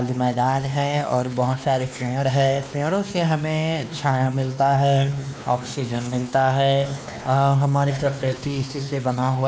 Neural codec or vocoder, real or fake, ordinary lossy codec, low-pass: codec, 16 kHz, 2 kbps, X-Codec, WavLM features, trained on Multilingual LibriSpeech; fake; none; none